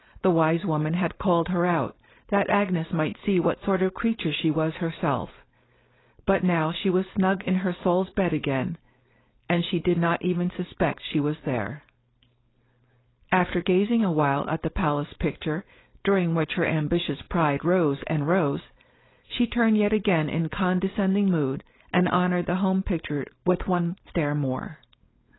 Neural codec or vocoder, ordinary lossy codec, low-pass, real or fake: codec, 16 kHz, 4.8 kbps, FACodec; AAC, 16 kbps; 7.2 kHz; fake